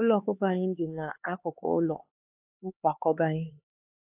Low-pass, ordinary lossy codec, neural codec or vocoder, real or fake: 3.6 kHz; AAC, 32 kbps; codec, 16 kHz, 4 kbps, X-Codec, HuBERT features, trained on LibriSpeech; fake